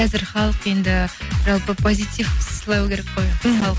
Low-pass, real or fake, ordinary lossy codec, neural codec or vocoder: none; real; none; none